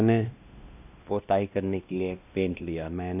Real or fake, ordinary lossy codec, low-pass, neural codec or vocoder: fake; none; 3.6 kHz; codec, 16 kHz, 1 kbps, X-Codec, WavLM features, trained on Multilingual LibriSpeech